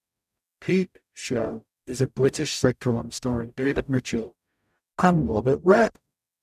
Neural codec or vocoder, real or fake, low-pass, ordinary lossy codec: codec, 44.1 kHz, 0.9 kbps, DAC; fake; 14.4 kHz; none